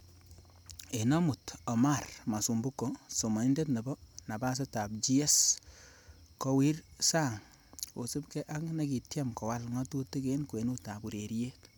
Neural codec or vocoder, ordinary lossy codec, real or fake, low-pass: none; none; real; none